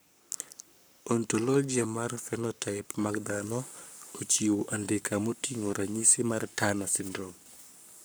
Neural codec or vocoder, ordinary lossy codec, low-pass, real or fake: codec, 44.1 kHz, 7.8 kbps, Pupu-Codec; none; none; fake